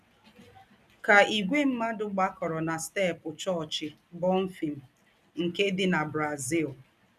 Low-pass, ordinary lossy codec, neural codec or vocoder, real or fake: 14.4 kHz; none; none; real